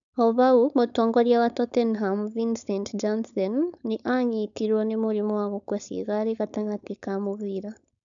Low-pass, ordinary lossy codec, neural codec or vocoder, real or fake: 7.2 kHz; none; codec, 16 kHz, 4.8 kbps, FACodec; fake